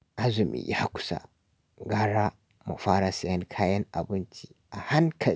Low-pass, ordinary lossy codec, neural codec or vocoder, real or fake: none; none; none; real